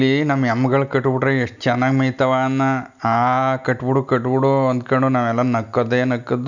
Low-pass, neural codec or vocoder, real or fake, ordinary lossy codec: 7.2 kHz; none; real; none